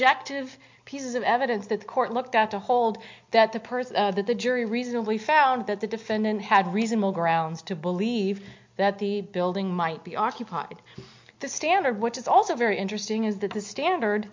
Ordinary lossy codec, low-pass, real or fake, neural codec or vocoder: MP3, 48 kbps; 7.2 kHz; real; none